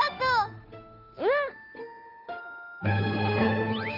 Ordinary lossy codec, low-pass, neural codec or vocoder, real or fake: none; 5.4 kHz; codec, 16 kHz, 8 kbps, FunCodec, trained on Chinese and English, 25 frames a second; fake